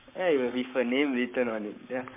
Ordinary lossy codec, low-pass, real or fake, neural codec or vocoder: MP3, 32 kbps; 3.6 kHz; fake; codec, 44.1 kHz, 7.8 kbps, Pupu-Codec